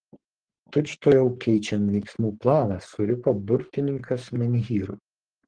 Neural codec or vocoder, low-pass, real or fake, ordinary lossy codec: codec, 44.1 kHz, 3.4 kbps, Pupu-Codec; 9.9 kHz; fake; Opus, 16 kbps